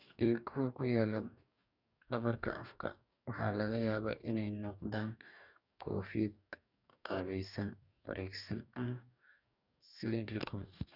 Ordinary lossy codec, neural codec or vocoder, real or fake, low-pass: none; codec, 44.1 kHz, 2.6 kbps, DAC; fake; 5.4 kHz